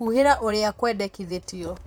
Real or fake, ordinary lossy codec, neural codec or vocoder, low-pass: fake; none; vocoder, 44.1 kHz, 128 mel bands, Pupu-Vocoder; none